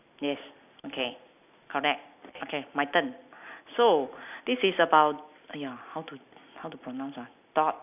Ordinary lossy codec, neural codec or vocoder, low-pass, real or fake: none; none; 3.6 kHz; real